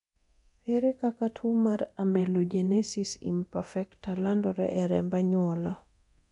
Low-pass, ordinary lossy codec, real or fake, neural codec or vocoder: 10.8 kHz; none; fake; codec, 24 kHz, 0.9 kbps, DualCodec